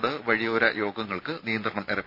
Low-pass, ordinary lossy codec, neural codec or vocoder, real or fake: 5.4 kHz; none; none; real